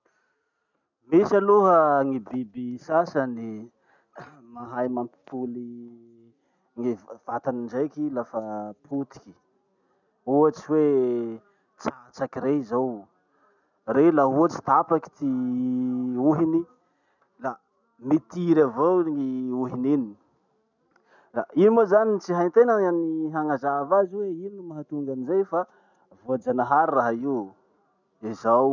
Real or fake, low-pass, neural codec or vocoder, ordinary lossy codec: real; 7.2 kHz; none; none